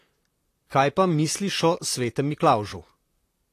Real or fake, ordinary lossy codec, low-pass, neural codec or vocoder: fake; AAC, 48 kbps; 14.4 kHz; vocoder, 44.1 kHz, 128 mel bands, Pupu-Vocoder